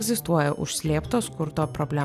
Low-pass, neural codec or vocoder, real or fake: 14.4 kHz; none; real